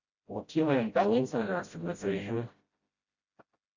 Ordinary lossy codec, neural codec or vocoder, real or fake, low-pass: Opus, 64 kbps; codec, 16 kHz, 0.5 kbps, FreqCodec, smaller model; fake; 7.2 kHz